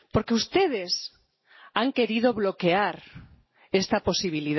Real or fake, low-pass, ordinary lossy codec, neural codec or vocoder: real; 7.2 kHz; MP3, 24 kbps; none